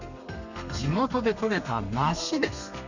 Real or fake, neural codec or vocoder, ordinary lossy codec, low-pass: fake; codec, 32 kHz, 1.9 kbps, SNAC; none; 7.2 kHz